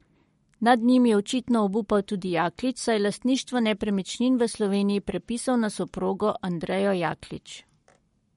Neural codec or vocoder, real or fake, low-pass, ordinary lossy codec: codec, 44.1 kHz, 7.8 kbps, Pupu-Codec; fake; 19.8 kHz; MP3, 48 kbps